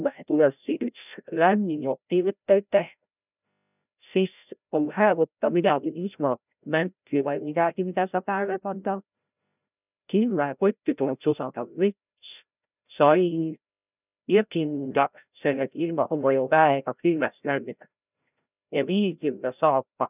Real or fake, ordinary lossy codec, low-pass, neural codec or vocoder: fake; none; 3.6 kHz; codec, 16 kHz, 0.5 kbps, FreqCodec, larger model